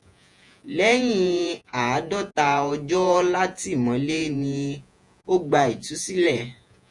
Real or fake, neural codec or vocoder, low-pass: fake; vocoder, 48 kHz, 128 mel bands, Vocos; 10.8 kHz